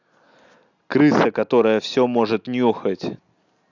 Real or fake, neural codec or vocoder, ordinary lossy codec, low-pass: real; none; none; 7.2 kHz